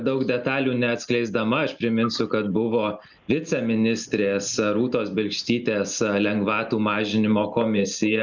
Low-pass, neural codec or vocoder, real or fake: 7.2 kHz; none; real